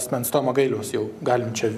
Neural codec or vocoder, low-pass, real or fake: none; 14.4 kHz; real